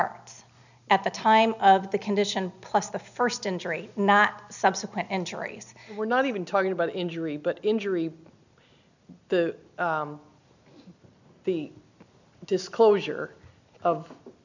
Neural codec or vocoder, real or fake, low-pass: none; real; 7.2 kHz